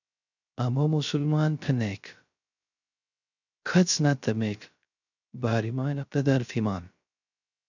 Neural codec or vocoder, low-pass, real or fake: codec, 16 kHz, 0.3 kbps, FocalCodec; 7.2 kHz; fake